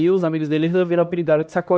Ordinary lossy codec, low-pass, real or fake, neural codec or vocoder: none; none; fake; codec, 16 kHz, 1 kbps, X-Codec, HuBERT features, trained on LibriSpeech